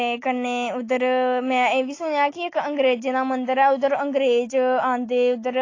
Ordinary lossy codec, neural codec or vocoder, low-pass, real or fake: AAC, 32 kbps; none; 7.2 kHz; real